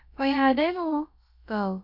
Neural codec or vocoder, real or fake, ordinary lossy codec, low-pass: codec, 16 kHz, about 1 kbps, DyCAST, with the encoder's durations; fake; none; 5.4 kHz